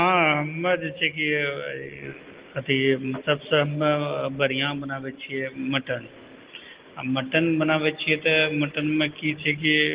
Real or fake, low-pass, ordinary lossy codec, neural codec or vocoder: real; 3.6 kHz; Opus, 16 kbps; none